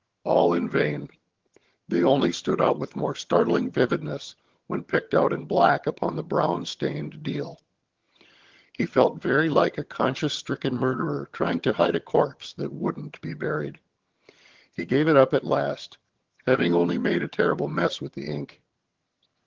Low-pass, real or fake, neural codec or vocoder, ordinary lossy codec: 7.2 kHz; fake; vocoder, 22.05 kHz, 80 mel bands, HiFi-GAN; Opus, 16 kbps